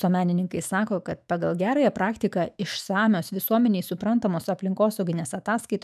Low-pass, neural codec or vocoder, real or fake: 14.4 kHz; autoencoder, 48 kHz, 128 numbers a frame, DAC-VAE, trained on Japanese speech; fake